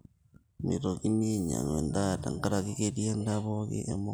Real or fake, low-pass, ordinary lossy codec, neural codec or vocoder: real; none; none; none